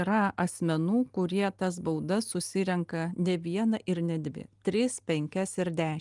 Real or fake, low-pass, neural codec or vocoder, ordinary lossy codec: real; 10.8 kHz; none; Opus, 32 kbps